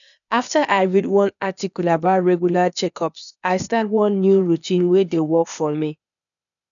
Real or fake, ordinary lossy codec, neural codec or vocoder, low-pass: fake; none; codec, 16 kHz, 0.8 kbps, ZipCodec; 7.2 kHz